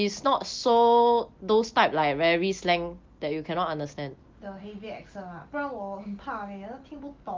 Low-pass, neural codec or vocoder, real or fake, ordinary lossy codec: 7.2 kHz; none; real; Opus, 32 kbps